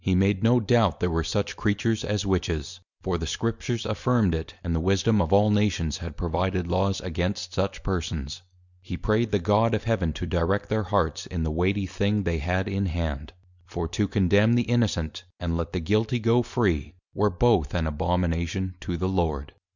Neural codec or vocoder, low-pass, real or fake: none; 7.2 kHz; real